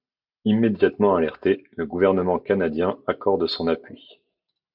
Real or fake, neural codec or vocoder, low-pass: real; none; 5.4 kHz